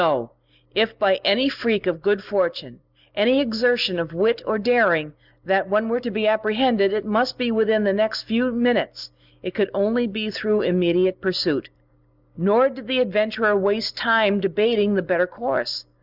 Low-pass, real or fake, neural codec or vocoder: 5.4 kHz; real; none